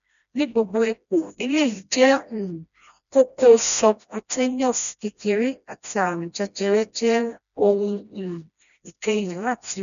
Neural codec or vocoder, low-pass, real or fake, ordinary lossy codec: codec, 16 kHz, 1 kbps, FreqCodec, smaller model; 7.2 kHz; fake; none